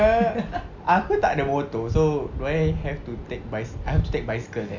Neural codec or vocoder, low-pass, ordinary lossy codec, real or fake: none; 7.2 kHz; MP3, 64 kbps; real